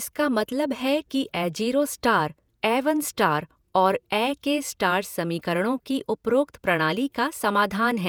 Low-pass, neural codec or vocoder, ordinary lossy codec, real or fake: none; vocoder, 48 kHz, 128 mel bands, Vocos; none; fake